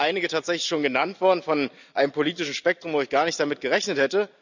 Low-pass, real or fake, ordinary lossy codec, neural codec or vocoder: 7.2 kHz; real; none; none